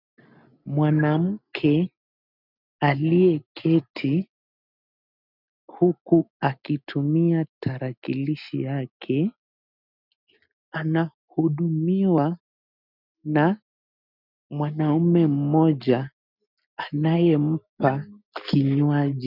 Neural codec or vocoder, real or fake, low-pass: none; real; 5.4 kHz